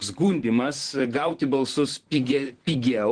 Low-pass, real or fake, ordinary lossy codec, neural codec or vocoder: 9.9 kHz; fake; Opus, 16 kbps; vocoder, 44.1 kHz, 128 mel bands, Pupu-Vocoder